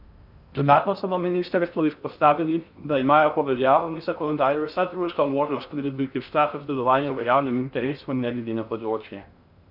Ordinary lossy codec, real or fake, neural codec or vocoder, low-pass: none; fake; codec, 16 kHz in and 24 kHz out, 0.6 kbps, FocalCodec, streaming, 4096 codes; 5.4 kHz